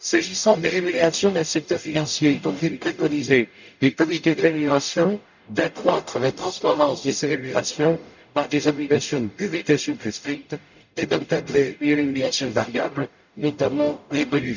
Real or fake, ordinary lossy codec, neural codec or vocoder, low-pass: fake; none; codec, 44.1 kHz, 0.9 kbps, DAC; 7.2 kHz